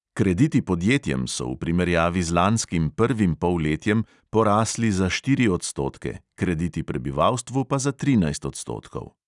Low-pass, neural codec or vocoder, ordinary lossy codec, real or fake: 10.8 kHz; none; none; real